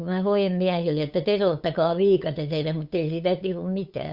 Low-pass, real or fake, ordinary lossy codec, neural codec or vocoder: 5.4 kHz; fake; Opus, 64 kbps; codec, 16 kHz, 8 kbps, FunCodec, trained on LibriTTS, 25 frames a second